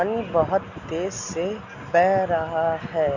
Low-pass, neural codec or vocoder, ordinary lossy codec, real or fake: 7.2 kHz; none; none; real